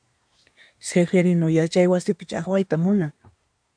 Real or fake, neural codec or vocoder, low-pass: fake; codec, 24 kHz, 1 kbps, SNAC; 9.9 kHz